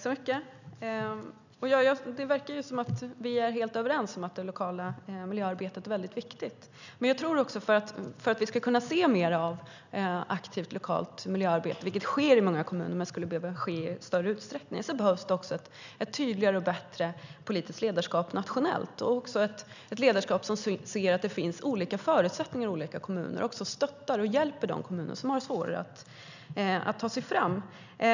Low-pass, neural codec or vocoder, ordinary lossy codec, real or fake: 7.2 kHz; none; none; real